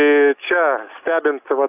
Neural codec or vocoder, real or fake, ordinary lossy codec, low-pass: none; real; AAC, 32 kbps; 3.6 kHz